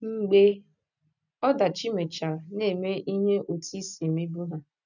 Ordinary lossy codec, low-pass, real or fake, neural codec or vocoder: none; 7.2 kHz; real; none